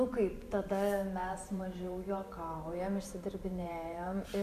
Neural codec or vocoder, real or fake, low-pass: vocoder, 44.1 kHz, 128 mel bands every 512 samples, BigVGAN v2; fake; 14.4 kHz